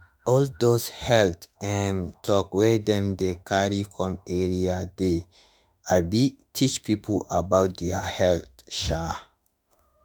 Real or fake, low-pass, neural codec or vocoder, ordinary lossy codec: fake; none; autoencoder, 48 kHz, 32 numbers a frame, DAC-VAE, trained on Japanese speech; none